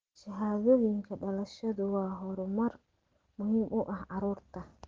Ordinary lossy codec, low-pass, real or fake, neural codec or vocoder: Opus, 16 kbps; 7.2 kHz; real; none